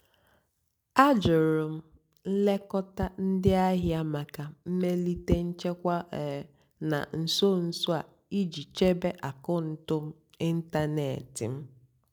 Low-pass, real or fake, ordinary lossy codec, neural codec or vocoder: none; real; none; none